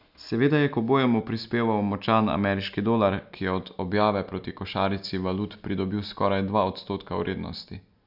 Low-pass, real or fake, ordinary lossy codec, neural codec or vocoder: 5.4 kHz; real; none; none